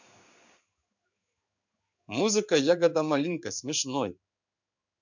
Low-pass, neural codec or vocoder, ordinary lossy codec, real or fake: 7.2 kHz; codec, 16 kHz in and 24 kHz out, 1 kbps, XY-Tokenizer; MP3, 64 kbps; fake